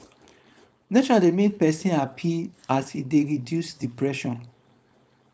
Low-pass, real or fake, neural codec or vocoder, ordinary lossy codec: none; fake; codec, 16 kHz, 4.8 kbps, FACodec; none